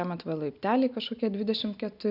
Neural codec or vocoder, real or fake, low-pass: none; real; 5.4 kHz